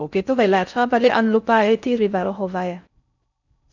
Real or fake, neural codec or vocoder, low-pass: fake; codec, 16 kHz in and 24 kHz out, 0.6 kbps, FocalCodec, streaming, 4096 codes; 7.2 kHz